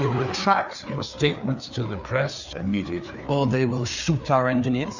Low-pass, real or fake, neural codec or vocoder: 7.2 kHz; fake; codec, 16 kHz, 4 kbps, FreqCodec, larger model